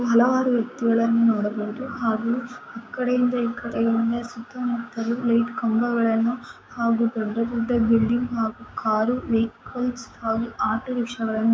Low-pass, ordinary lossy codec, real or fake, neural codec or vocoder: none; none; fake; codec, 16 kHz, 6 kbps, DAC